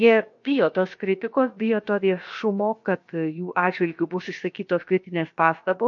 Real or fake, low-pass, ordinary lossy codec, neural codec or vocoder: fake; 7.2 kHz; MP3, 48 kbps; codec, 16 kHz, about 1 kbps, DyCAST, with the encoder's durations